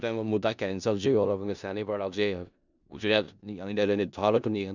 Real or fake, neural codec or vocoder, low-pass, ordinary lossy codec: fake; codec, 16 kHz in and 24 kHz out, 0.4 kbps, LongCat-Audio-Codec, four codebook decoder; 7.2 kHz; none